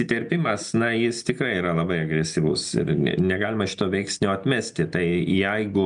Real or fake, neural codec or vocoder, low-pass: real; none; 9.9 kHz